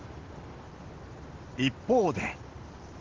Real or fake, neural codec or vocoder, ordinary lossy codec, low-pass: real; none; Opus, 16 kbps; 7.2 kHz